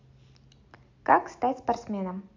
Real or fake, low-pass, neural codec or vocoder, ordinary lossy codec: real; 7.2 kHz; none; AAC, 48 kbps